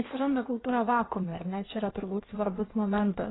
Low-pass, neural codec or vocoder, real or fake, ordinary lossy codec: 7.2 kHz; codec, 16 kHz in and 24 kHz out, 1.1 kbps, FireRedTTS-2 codec; fake; AAC, 16 kbps